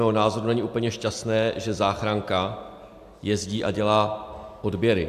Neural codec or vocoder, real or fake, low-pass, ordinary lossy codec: none; real; 14.4 kHz; AAC, 96 kbps